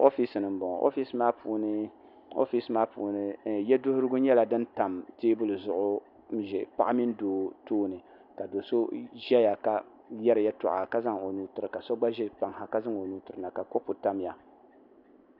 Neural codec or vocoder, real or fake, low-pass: none; real; 5.4 kHz